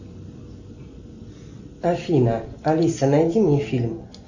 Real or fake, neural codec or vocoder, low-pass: real; none; 7.2 kHz